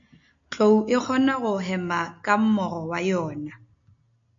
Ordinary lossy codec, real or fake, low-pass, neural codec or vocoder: AAC, 64 kbps; real; 7.2 kHz; none